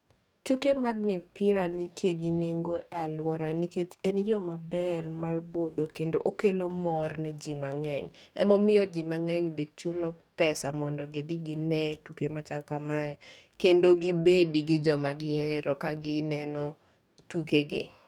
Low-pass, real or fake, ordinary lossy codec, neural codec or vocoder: 19.8 kHz; fake; none; codec, 44.1 kHz, 2.6 kbps, DAC